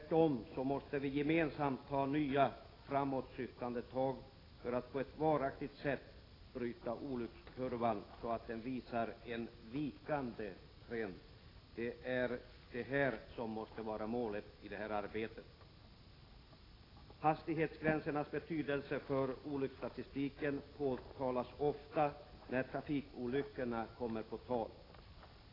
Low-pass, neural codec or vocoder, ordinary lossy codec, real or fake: 5.4 kHz; none; AAC, 24 kbps; real